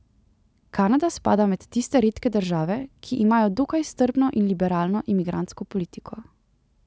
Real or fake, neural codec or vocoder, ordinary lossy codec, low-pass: real; none; none; none